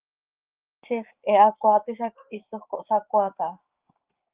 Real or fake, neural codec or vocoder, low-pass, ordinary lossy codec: fake; codec, 16 kHz, 6 kbps, DAC; 3.6 kHz; Opus, 32 kbps